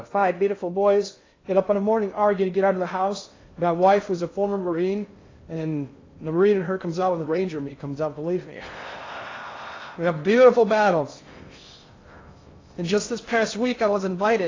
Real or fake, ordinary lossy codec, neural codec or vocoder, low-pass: fake; AAC, 32 kbps; codec, 16 kHz in and 24 kHz out, 0.6 kbps, FocalCodec, streaming, 2048 codes; 7.2 kHz